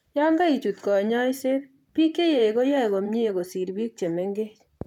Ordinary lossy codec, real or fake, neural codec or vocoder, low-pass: none; fake; vocoder, 44.1 kHz, 128 mel bands, Pupu-Vocoder; 19.8 kHz